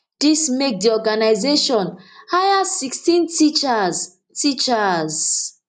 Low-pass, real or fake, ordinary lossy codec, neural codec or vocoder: 10.8 kHz; real; none; none